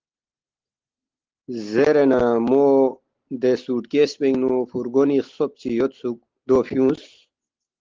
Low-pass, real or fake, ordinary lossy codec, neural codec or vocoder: 7.2 kHz; real; Opus, 16 kbps; none